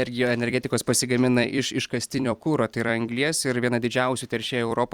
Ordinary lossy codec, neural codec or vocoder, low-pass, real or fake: Opus, 64 kbps; vocoder, 44.1 kHz, 128 mel bands every 256 samples, BigVGAN v2; 19.8 kHz; fake